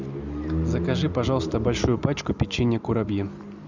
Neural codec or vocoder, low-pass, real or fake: none; 7.2 kHz; real